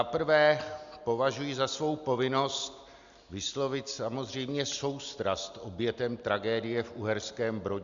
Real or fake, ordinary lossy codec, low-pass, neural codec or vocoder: real; Opus, 64 kbps; 7.2 kHz; none